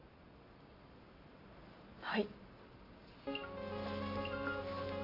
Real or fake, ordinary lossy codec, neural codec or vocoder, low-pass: real; none; none; 5.4 kHz